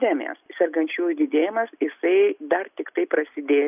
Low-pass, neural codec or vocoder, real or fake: 3.6 kHz; none; real